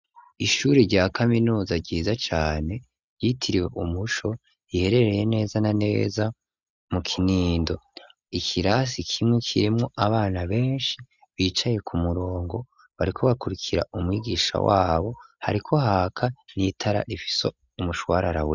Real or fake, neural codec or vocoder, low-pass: real; none; 7.2 kHz